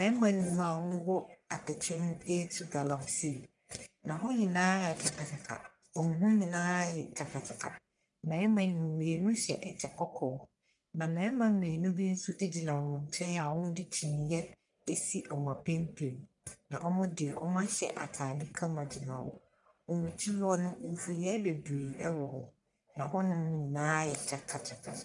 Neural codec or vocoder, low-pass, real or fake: codec, 44.1 kHz, 1.7 kbps, Pupu-Codec; 10.8 kHz; fake